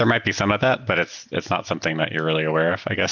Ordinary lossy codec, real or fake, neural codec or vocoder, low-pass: Opus, 32 kbps; real; none; 7.2 kHz